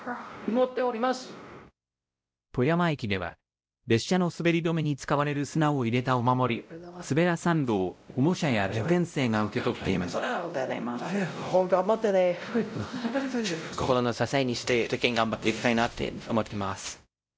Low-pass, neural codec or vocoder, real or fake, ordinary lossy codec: none; codec, 16 kHz, 0.5 kbps, X-Codec, WavLM features, trained on Multilingual LibriSpeech; fake; none